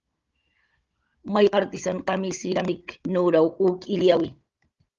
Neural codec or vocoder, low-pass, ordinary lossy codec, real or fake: codec, 16 kHz, 16 kbps, FunCodec, trained on Chinese and English, 50 frames a second; 7.2 kHz; Opus, 32 kbps; fake